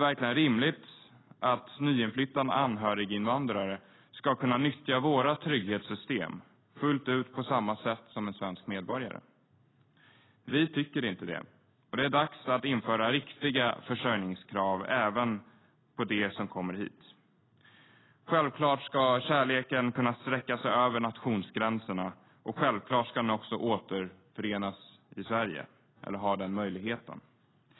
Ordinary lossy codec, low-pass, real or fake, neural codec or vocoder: AAC, 16 kbps; 7.2 kHz; real; none